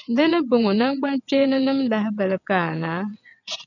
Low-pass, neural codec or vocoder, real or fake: 7.2 kHz; vocoder, 22.05 kHz, 80 mel bands, WaveNeXt; fake